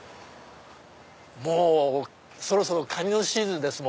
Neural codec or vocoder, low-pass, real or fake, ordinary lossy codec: none; none; real; none